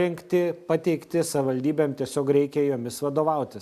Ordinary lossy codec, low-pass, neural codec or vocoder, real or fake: AAC, 96 kbps; 14.4 kHz; none; real